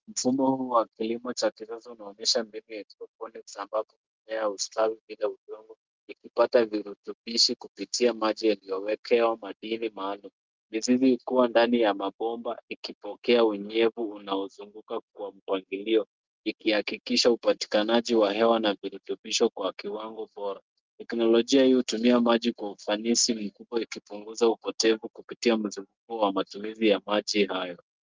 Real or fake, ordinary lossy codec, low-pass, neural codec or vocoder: real; Opus, 32 kbps; 7.2 kHz; none